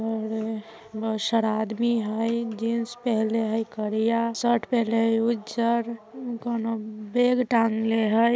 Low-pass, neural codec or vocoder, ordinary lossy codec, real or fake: none; none; none; real